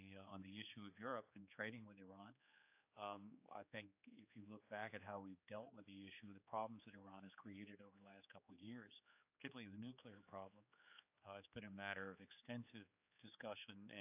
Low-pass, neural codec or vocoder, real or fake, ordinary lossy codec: 3.6 kHz; codec, 16 kHz, 4 kbps, X-Codec, HuBERT features, trained on general audio; fake; AAC, 32 kbps